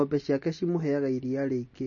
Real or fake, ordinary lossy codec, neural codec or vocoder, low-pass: real; MP3, 32 kbps; none; 7.2 kHz